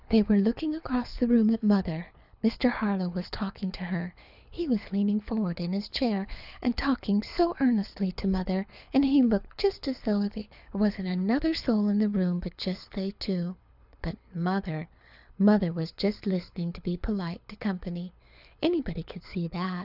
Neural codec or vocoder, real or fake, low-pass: codec, 24 kHz, 6 kbps, HILCodec; fake; 5.4 kHz